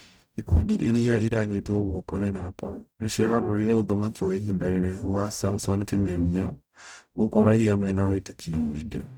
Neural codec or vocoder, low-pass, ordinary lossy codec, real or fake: codec, 44.1 kHz, 0.9 kbps, DAC; none; none; fake